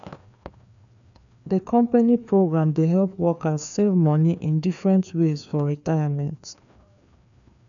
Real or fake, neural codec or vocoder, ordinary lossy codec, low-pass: fake; codec, 16 kHz, 2 kbps, FreqCodec, larger model; none; 7.2 kHz